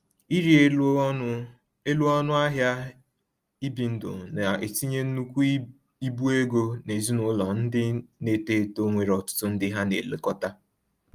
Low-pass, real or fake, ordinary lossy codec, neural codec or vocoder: 14.4 kHz; real; Opus, 32 kbps; none